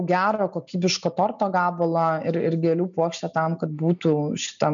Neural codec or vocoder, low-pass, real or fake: none; 7.2 kHz; real